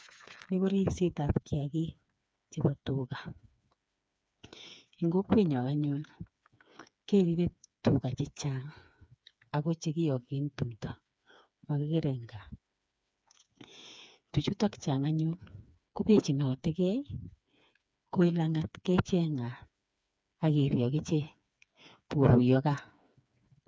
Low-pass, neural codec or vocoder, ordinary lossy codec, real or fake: none; codec, 16 kHz, 4 kbps, FreqCodec, smaller model; none; fake